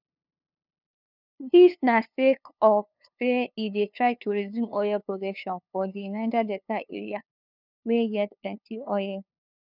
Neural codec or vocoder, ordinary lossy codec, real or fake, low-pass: codec, 16 kHz, 2 kbps, FunCodec, trained on LibriTTS, 25 frames a second; none; fake; 5.4 kHz